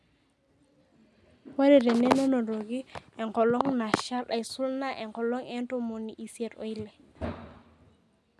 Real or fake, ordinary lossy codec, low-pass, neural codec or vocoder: real; none; none; none